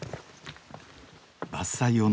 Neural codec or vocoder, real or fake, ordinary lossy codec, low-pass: none; real; none; none